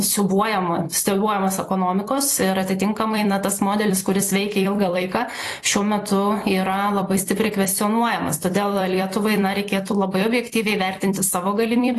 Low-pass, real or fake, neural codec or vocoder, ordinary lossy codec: 14.4 kHz; fake; vocoder, 44.1 kHz, 128 mel bands every 256 samples, BigVGAN v2; AAC, 48 kbps